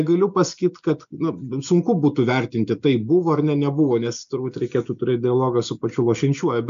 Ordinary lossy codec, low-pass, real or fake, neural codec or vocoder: MP3, 64 kbps; 7.2 kHz; real; none